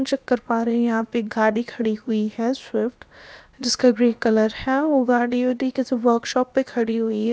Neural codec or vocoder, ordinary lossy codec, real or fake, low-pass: codec, 16 kHz, about 1 kbps, DyCAST, with the encoder's durations; none; fake; none